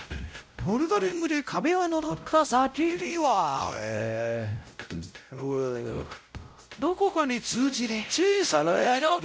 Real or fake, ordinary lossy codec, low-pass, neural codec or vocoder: fake; none; none; codec, 16 kHz, 0.5 kbps, X-Codec, WavLM features, trained on Multilingual LibriSpeech